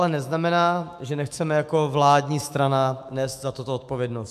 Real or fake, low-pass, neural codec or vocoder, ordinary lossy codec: fake; 14.4 kHz; autoencoder, 48 kHz, 128 numbers a frame, DAC-VAE, trained on Japanese speech; MP3, 96 kbps